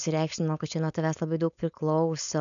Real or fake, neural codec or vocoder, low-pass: fake; codec, 16 kHz, 4.8 kbps, FACodec; 7.2 kHz